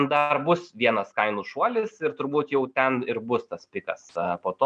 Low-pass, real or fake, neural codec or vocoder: 9.9 kHz; real; none